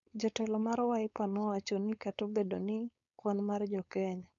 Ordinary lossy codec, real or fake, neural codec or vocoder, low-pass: none; fake; codec, 16 kHz, 4.8 kbps, FACodec; 7.2 kHz